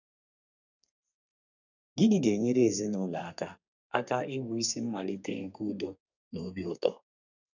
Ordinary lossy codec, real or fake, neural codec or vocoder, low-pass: none; fake; codec, 32 kHz, 1.9 kbps, SNAC; 7.2 kHz